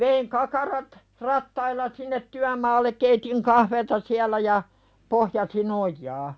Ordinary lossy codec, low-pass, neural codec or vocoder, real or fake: none; none; none; real